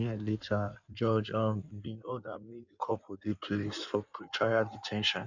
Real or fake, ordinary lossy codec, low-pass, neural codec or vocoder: fake; none; 7.2 kHz; codec, 16 kHz in and 24 kHz out, 1.1 kbps, FireRedTTS-2 codec